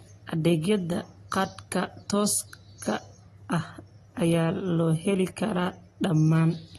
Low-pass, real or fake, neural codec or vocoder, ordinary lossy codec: 19.8 kHz; real; none; AAC, 32 kbps